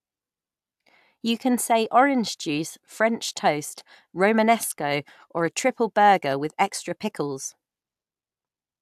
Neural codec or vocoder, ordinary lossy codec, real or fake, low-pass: none; AAC, 96 kbps; real; 14.4 kHz